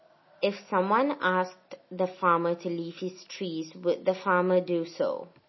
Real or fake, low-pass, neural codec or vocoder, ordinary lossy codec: real; 7.2 kHz; none; MP3, 24 kbps